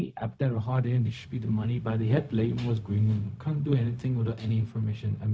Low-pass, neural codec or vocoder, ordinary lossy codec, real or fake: none; codec, 16 kHz, 0.4 kbps, LongCat-Audio-Codec; none; fake